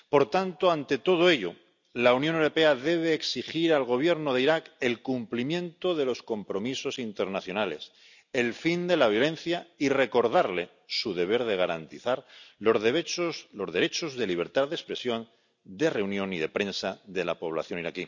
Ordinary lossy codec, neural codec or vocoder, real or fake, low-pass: none; none; real; 7.2 kHz